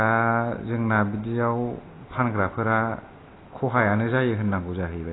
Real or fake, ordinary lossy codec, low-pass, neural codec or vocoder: real; AAC, 16 kbps; 7.2 kHz; none